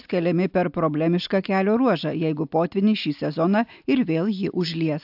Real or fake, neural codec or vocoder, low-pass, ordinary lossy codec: real; none; 5.4 kHz; AAC, 48 kbps